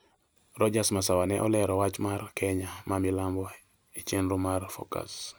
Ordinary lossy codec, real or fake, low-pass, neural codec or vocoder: none; real; none; none